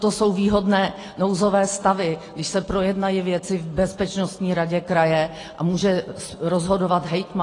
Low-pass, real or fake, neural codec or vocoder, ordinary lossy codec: 10.8 kHz; real; none; AAC, 32 kbps